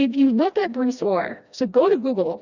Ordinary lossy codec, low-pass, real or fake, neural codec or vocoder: Opus, 64 kbps; 7.2 kHz; fake; codec, 16 kHz, 1 kbps, FreqCodec, smaller model